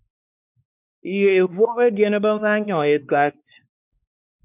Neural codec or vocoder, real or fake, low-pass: codec, 16 kHz, 2 kbps, X-Codec, WavLM features, trained on Multilingual LibriSpeech; fake; 3.6 kHz